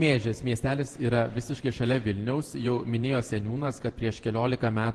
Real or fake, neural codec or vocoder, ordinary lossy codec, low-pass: fake; vocoder, 48 kHz, 128 mel bands, Vocos; Opus, 16 kbps; 10.8 kHz